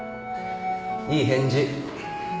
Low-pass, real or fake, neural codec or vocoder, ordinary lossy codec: none; real; none; none